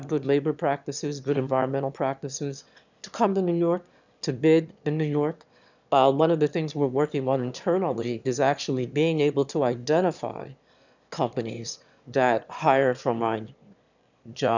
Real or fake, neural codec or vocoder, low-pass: fake; autoencoder, 22.05 kHz, a latent of 192 numbers a frame, VITS, trained on one speaker; 7.2 kHz